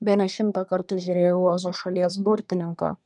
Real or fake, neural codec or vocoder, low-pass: fake; codec, 24 kHz, 1 kbps, SNAC; 10.8 kHz